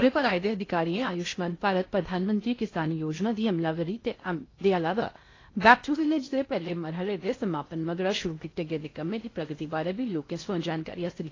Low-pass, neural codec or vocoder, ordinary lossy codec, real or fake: 7.2 kHz; codec, 16 kHz in and 24 kHz out, 0.8 kbps, FocalCodec, streaming, 65536 codes; AAC, 32 kbps; fake